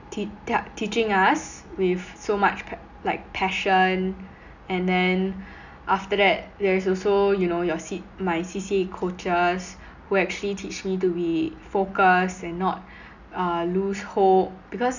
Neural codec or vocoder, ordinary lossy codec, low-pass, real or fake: none; none; 7.2 kHz; real